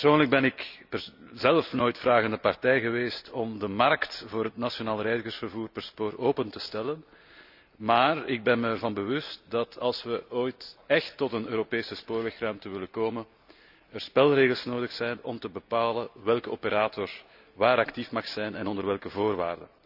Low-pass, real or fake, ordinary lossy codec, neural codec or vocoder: 5.4 kHz; real; none; none